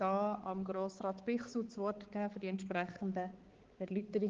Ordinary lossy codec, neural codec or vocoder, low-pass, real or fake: Opus, 16 kbps; codec, 16 kHz, 4 kbps, X-Codec, HuBERT features, trained on balanced general audio; 7.2 kHz; fake